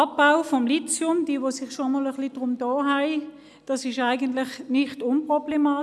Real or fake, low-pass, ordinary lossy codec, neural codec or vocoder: real; none; none; none